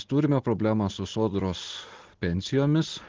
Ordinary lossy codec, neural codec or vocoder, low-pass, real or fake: Opus, 16 kbps; none; 7.2 kHz; real